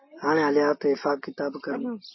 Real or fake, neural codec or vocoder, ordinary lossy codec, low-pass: real; none; MP3, 24 kbps; 7.2 kHz